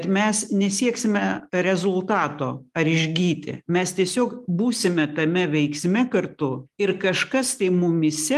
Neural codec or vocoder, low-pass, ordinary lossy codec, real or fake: none; 14.4 kHz; Opus, 32 kbps; real